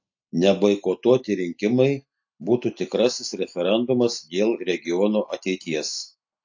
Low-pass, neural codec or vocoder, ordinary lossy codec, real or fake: 7.2 kHz; none; AAC, 48 kbps; real